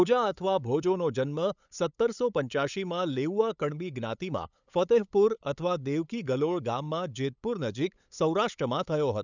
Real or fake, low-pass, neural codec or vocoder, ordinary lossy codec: fake; 7.2 kHz; codec, 16 kHz, 8 kbps, FunCodec, trained on LibriTTS, 25 frames a second; none